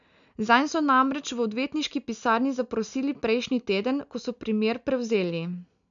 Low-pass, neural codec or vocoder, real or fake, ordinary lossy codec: 7.2 kHz; none; real; none